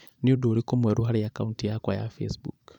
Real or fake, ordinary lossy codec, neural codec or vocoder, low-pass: fake; none; vocoder, 44.1 kHz, 128 mel bands every 512 samples, BigVGAN v2; 19.8 kHz